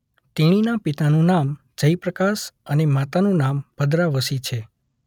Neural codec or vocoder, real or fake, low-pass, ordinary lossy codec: none; real; 19.8 kHz; none